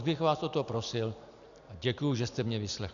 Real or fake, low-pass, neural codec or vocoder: real; 7.2 kHz; none